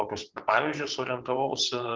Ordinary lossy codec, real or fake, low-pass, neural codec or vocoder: Opus, 16 kbps; fake; 7.2 kHz; vocoder, 22.05 kHz, 80 mel bands, WaveNeXt